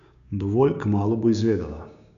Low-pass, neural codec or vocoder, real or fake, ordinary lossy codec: 7.2 kHz; codec, 16 kHz, 6 kbps, DAC; fake; none